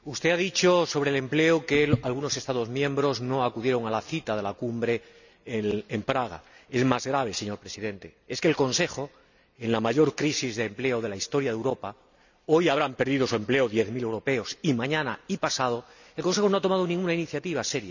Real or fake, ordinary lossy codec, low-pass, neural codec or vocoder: real; none; 7.2 kHz; none